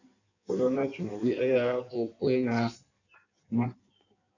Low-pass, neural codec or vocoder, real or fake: 7.2 kHz; codec, 16 kHz in and 24 kHz out, 1.1 kbps, FireRedTTS-2 codec; fake